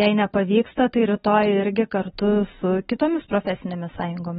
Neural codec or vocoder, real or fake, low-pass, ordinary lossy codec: none; real; 7.2 kHz; AAC, 16 kbps